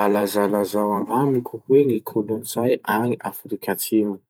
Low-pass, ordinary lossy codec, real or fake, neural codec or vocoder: none; none; fake; vocoder, 44.1 kHz, 128 mel bands every 512 samples, BigVGAN v2